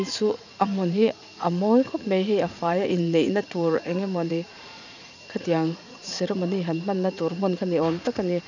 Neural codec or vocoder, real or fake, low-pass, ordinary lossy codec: vocoder, 44.1 kHz, 80 mel bands, Vocos; fake; 7.2 kHz; none